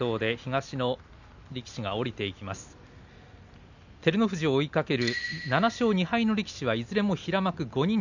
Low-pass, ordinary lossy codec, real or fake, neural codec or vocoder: 7.2 kHz; none; real; none